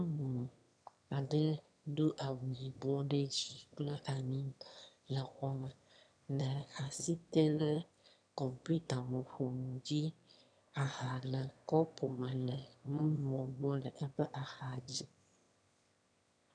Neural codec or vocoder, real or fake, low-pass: autoencoder, 22.05 kHz, a latent of 192 numbers a frame, VITS, trained on one speaker; fake; 9.9 kHz